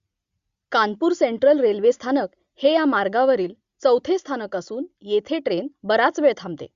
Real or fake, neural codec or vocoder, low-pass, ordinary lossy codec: real; none; 7.2 kHz; Opus, 64 kbps